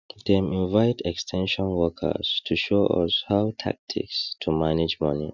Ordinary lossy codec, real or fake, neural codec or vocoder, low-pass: none; real; none; 7.2 kHz